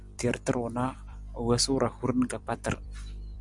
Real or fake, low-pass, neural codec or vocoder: fake; 10.8 kHz; vocoder, 44.1 kHz, 128 mel bands every 256 samples, BigVGAN v2